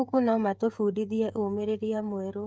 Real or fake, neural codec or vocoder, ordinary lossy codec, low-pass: fake; codec, 16 kHz, 8 kbps, FreqCodec, smaller model; none; none